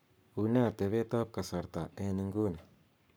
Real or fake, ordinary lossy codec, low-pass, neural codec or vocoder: fake; none; none; codec, 44.1 kHz, 7.8 kbps, Pupu-Codec